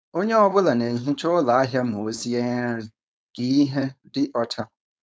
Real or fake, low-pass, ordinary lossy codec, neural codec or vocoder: fake; none; none; codec, 16 kHz, 4.8 kbps, FACodec